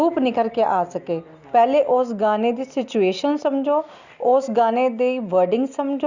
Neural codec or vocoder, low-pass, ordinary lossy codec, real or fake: none; 7.2 kHz; Opus, 64 kbps; real